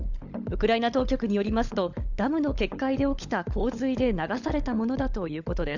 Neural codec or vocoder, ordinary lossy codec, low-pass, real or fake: codec, 16 kHz, 16 kbps, FunCodec, trained on LibriTTS, 50 frames a second; none; 7.2 kHz; fake